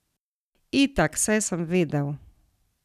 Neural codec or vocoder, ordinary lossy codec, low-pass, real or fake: none; none; 14.4 kHz; real